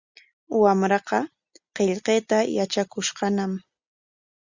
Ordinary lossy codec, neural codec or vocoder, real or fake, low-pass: Opus, 64 kbps; none; real; 7.2 kHz